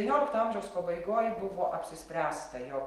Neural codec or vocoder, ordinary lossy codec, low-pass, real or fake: none; Opus, 24 kbps; 14.4 kHz; real